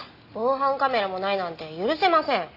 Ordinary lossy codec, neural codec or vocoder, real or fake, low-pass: none; none; real; 5.4 kHz